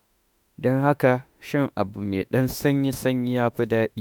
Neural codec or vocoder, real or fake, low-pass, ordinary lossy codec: autoencoder, 48 kHz, 32 numbers a frame, DAC-VAE, trained on Japanese speech; fake; none; none